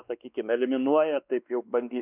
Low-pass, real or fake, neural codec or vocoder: 3.6 kHz; fake; codec, 16 kHz, 2 kbps, X-Codec, WavLM features, trained on Multilingual LibriSpeech